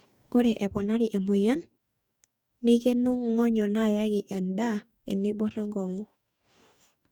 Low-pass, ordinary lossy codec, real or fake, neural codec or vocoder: 19.8 kHz; none; fake; codec, 44.1 kHz, 2.6 kbps, DAC